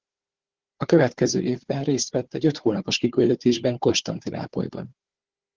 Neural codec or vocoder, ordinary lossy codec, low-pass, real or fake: codec, 16 kHz, 4 kbps, FunCodec, trained on Chinese and English, 50 frames a second; Opus, 16 kbps; 7.2 kHz; fake